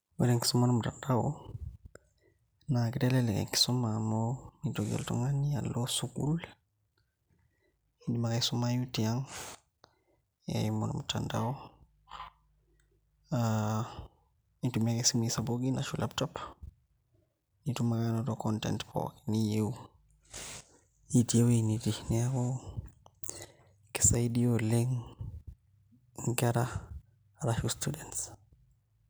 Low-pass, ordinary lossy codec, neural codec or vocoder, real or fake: none; none; none; real